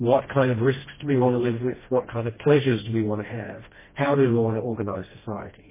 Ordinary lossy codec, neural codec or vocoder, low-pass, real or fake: MP3, 16 kbps; codec, 16 kHz, 1 kbps, FreqCodec, smaller model; 3.6 kHz; fake